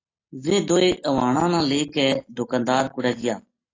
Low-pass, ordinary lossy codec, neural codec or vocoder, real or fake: 7.2 kHz; AAC, 32 kbps; none; real